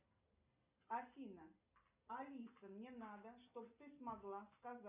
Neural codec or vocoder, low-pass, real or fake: none; 3.6 kHz; real